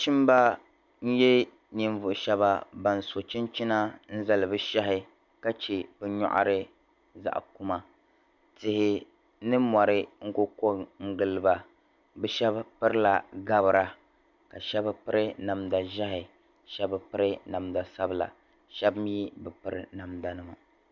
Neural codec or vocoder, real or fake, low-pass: none; real; 7.2 kHz